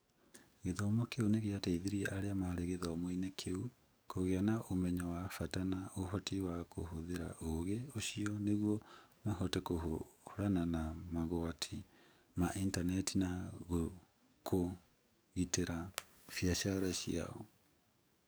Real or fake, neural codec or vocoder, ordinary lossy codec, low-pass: fake; codec, 44.1 kHz, 7.8 kbps, DAC; none; none